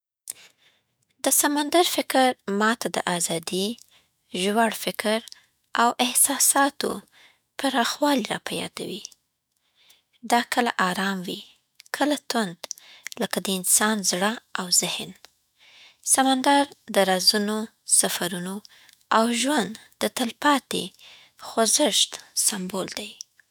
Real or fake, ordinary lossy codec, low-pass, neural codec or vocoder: fake; none; none; autoencoder, 48 kHz, 128 numbers a frame, DAC-VAE, trained on Japanese speech